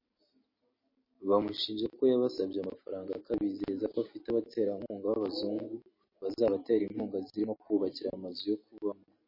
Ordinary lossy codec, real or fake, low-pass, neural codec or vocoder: MP3, 24 kbps; real; 5.4 kHz; none